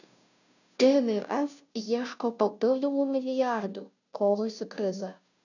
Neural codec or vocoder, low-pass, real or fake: codec, 16 kHz, 0.5 kbps, FunCodec, trained on Chinese and English, 25 frames a second; 7.2 kHz; fake